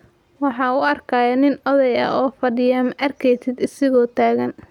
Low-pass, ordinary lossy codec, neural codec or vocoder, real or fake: 19.8 kHz; none; none; real